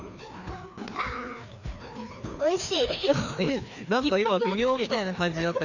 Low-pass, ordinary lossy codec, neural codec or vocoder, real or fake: 7.2 kHz; none; codec, 16 kHz, 2 kbps, FreqCodec, larger model; fake